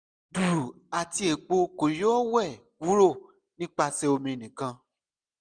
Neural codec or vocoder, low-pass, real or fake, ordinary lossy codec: none; 9.9 kHz; real; none